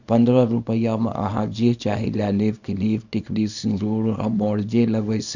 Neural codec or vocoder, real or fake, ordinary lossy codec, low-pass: codec, 24 kHz, 0.9 kbps, WavTokenizer, small release; fake; none; 7.2 kHz